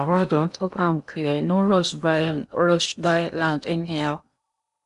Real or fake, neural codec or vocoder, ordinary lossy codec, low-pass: fake; codec, 16 kHz in and 24 kHz out, 0.8 kbps, FocalCodec, streaming, 65536 codes; none; 10.8 kHz